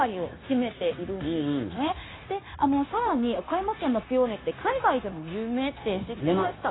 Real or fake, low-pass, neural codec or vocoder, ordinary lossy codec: fake; 7.2 kHz; codec, 16 kHz, 0.9 kbps, LongCat-Audio-Codec; AAC, 16 kbps